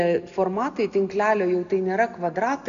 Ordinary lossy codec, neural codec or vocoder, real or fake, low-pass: MP3, 96 kbps; none; real; 7.2 kHz